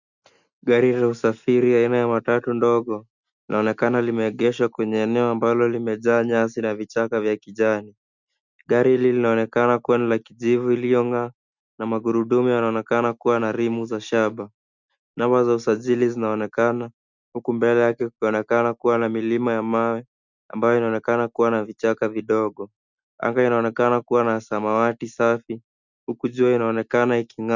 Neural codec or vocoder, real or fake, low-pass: none; real; 7.2 kHz